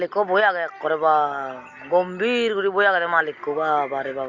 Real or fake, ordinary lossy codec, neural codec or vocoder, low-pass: real; Opus, 64 kbps; none; 7.2 kHz